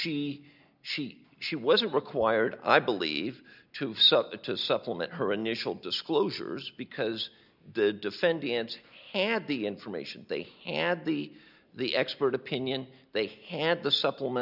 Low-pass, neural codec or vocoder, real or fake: 5.4 kHz; none; real